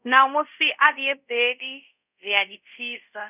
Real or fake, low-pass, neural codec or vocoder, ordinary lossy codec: fake; 3.6 kHz; codec, 24 kHz, 0.5 kbps, DualCodec; none